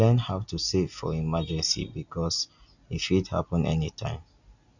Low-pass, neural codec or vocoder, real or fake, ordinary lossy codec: 7.2 kHz; none; real; none